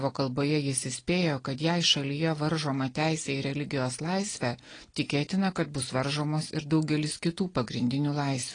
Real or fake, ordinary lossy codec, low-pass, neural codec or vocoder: real; AAC, 32 kbps; 9.9 kHz; none